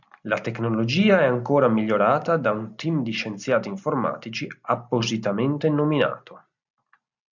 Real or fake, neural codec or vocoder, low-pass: real; none; 7.2 kHz